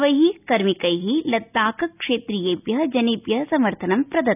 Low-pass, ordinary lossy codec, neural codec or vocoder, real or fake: 3.6 kHz; none; none; real